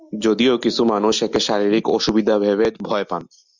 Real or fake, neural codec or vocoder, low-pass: real; none; 7.2 kHz